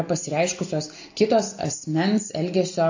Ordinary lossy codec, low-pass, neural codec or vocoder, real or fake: AAC, 48 kbps; 7.2 kHz; none; real